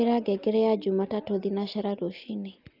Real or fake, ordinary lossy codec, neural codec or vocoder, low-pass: real; Opus, 32 kbps; none; 5.4 kHz